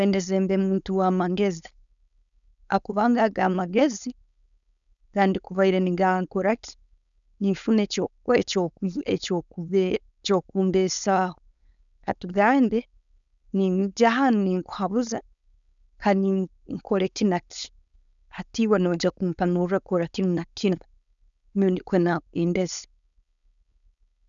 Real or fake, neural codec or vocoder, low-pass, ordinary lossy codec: fake; codec, 16 kHz, 4.8 kbps, FACodec; 7.2 kHz; none